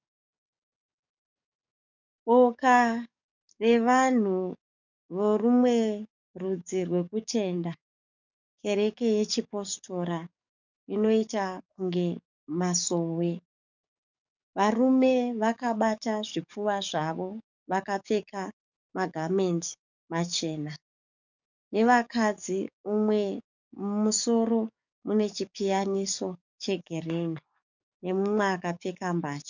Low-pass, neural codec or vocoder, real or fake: 7.2 kHz; codec, 44.1 kHz, 7.8 kbps, DAC; fake